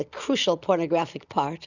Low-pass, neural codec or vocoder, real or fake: 7.2 kHz; none; real